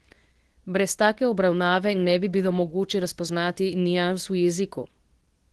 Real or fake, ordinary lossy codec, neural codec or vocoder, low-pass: fake; Opus, 16 kbps; codec, 24 kHz, 0.9 kbps, WavTokenizer, medium speech release version 2; 10.8 kHz